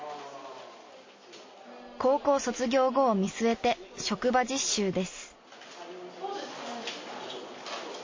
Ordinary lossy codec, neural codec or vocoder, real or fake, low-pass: MP3, 32 kbps; none; real; 7.2 kHz